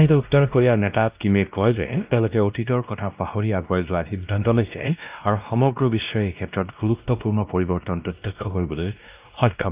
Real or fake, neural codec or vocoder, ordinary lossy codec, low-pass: fake; codec, 16 kHz, 1 kbps, X-Codec, WavLM features, trained on Multilingual LibriSpeech; Opus, 24 kbps; 3.6 kHz